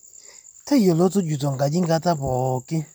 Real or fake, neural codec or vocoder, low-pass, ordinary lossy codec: real; none; none; none